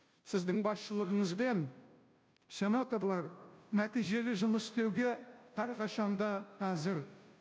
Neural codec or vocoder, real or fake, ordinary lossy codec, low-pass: codec, 16 kHz, 0.5 kbps, FunCodec, trained on Chinese and English, 25 frames a second; fake; none; none